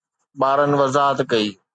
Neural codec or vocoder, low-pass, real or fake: none; 9.9 kHz; real